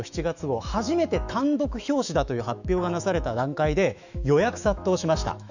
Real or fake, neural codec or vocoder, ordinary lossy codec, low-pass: fake; autoencoder, 48 kHz, 128 numbers a frame, DAC-VAE, trained on Japanese speech; none; 7.2 kHz